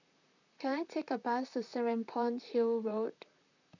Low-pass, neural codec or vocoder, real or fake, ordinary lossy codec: 7.2 kHz; vocoder, 44.1 kHz, 128 mel bands, Pupu-Vocoder; fake; none